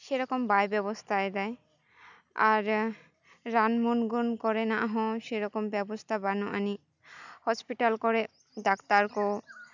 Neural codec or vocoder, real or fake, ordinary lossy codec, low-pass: none; real; none; 7.2 kHz